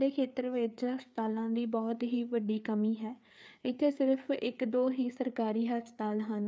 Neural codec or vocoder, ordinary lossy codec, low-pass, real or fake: codec, 16 kHz, 4 kbps, FreqCodec, larger model; none; none; fake